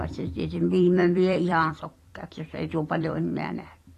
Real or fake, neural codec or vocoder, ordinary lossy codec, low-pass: fake; vocoder, 44.1 kHz, 128 mel bands every 256 samples, BigVGAN v2; AAC, 48 kbps; 14.4 kHz